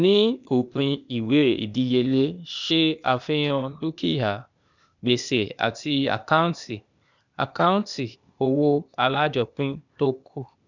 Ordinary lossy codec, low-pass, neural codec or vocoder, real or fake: none; 7.2 kHz; codec, 16 kHz, 0.8 kbps, ZipCodec; fake